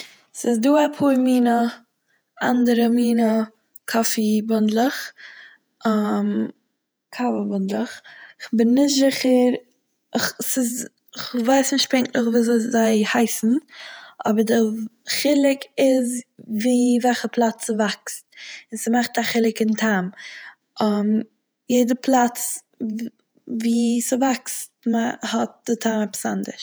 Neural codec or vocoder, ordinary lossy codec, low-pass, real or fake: vocoder, 48 kHz, 128 mel bands, Vocos; none; none; fake